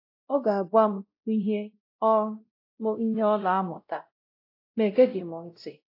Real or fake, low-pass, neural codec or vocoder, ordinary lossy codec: fake; 5.4 kHz; codec, 16 kHz, 0.5 kbps, X-Codec, WavLM features, trained on Multilingual LibriSpeech; AAC, 32 kbps